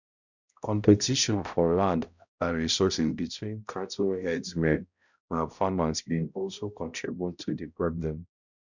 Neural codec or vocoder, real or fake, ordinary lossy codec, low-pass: codec, 16 kHz, 0.5 kbps, X-Codec, HuBERT features, trained on balanced general audio; fake; none; 7.2 kHz